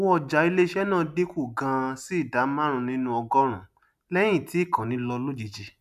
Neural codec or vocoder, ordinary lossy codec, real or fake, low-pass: none; none; real; 14.4 kHz